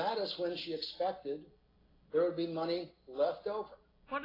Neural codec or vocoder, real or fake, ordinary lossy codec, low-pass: none; real; AAC, 24 kbps; 5.4 kHz